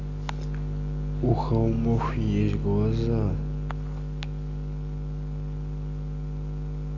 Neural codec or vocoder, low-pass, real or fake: none; 7.2 kHz; real